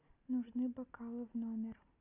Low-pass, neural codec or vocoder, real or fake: 3.6 kHz; none; real